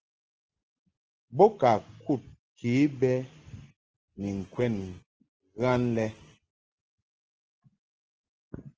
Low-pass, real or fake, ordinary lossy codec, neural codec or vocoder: 7.2 kHz; real; Opus, 16 kbps; none